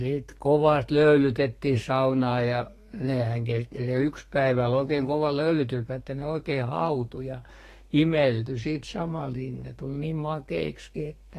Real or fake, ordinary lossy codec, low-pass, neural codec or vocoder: fake; AAC, 48 kbps; 14.4 kHz; codec, 32 kHz, 1.9 kbps, SNAC